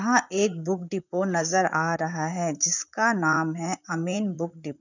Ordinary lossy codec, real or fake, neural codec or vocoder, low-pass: none; fake; vocoder, 44.1 kHz, 128 mel bands, Pupu-Vocoder; 7.2 kHz